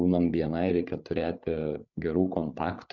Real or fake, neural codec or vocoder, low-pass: fake; codec, 16 kHz, 8 kbps, FreqCodec, larger model; 7.2 kHz